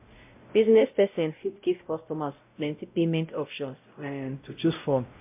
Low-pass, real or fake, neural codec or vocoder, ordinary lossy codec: 3.6 kHz; fake; codec, 16 kHz, 0.5 kbps, X-Codec, WavLM features, trained on Multilingual LibriSpeech; MP3, 32 kbps